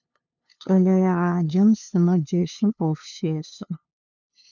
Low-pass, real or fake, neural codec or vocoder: 7.2 kHz; fake; codec, 16 kHz, 2 kbps, FunCodec, trained on LibriTTS, 25 frames a second